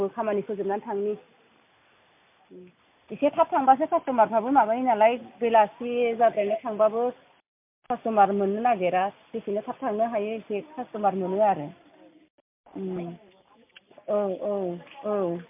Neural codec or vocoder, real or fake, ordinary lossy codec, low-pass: none; real; MP3, 32 kbps; 3.6 kHz